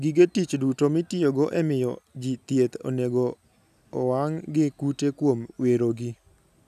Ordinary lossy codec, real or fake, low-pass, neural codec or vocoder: none; real; 14.4 kHz; none